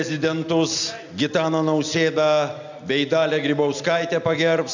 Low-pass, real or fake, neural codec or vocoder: 7.2 kHz; real; none